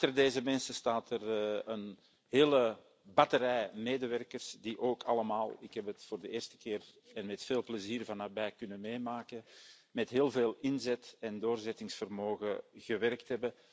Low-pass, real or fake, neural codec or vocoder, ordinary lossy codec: none; real; none; none